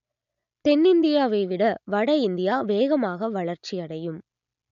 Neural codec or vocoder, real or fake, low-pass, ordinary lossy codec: none; real; 7.2 kHz; none